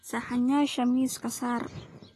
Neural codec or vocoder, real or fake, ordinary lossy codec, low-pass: none; real; AAC, 48 kbps; 14.4 kHz